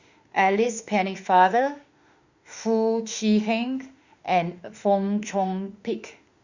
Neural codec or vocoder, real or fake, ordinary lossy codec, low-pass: autoencoder, 48 kHz, 32 numbers a frame, DAC-VAE, trained on Japanese speech; fake; Opus, 64 kbps; 7.2 kHz